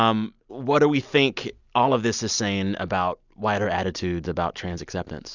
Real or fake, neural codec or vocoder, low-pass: real; none; 7.2 kHz